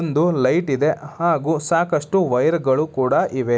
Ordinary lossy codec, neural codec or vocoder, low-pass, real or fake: none; none; none; real